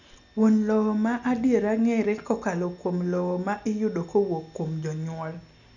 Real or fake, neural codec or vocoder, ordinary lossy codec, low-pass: real; none; none; 7.2 kHz